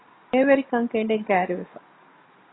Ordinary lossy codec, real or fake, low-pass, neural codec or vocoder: AAC, 16 kbps; real; 7.2 kHz; none